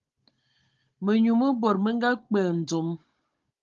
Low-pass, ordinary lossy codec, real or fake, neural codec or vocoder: 7.2 kHz; Opus, 32 kbps; fake; codec, 16 kHz, 16 kbps, FunCodec, trained on Chinese and English, 50 frames a second